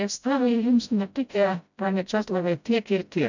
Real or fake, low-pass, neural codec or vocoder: fake; 7.2 kHz; codec, 16 kHz, 0.5 kbps, FreqCodec, smaller model